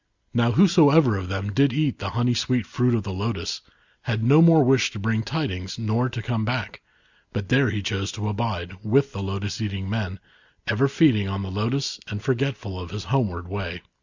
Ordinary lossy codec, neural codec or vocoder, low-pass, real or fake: Opus, 64 kbps; none; 7.2 kHz; real